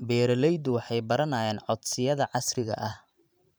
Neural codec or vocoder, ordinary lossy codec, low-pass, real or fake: vocoder, 44.1 kHz, 128 mel bands every 512 samples, BigVGAN v2; none; none; fake